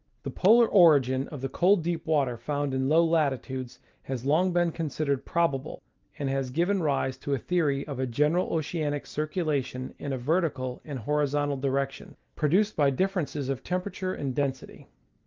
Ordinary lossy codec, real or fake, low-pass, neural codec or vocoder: Opus, 24 kbps; real; 7.2 kHz; none